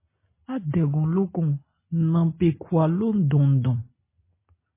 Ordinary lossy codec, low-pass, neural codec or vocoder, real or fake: MP3, 16 kbps; 3.6 kHz; none; real